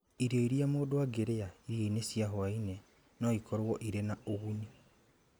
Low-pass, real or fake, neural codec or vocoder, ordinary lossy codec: none; real; none; none